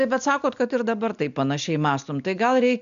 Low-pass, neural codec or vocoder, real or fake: 7.2 kHz; none; real